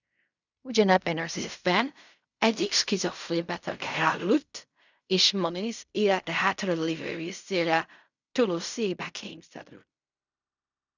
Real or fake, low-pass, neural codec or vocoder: fake; 7.2 kHz; codec, 16 kHz in and 24 kHz out, 0.4 kbps, LongCat-Audio-Codec, fine tuned four codebook decoder